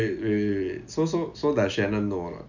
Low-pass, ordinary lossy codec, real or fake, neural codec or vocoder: 7.2 kHz; none; real; none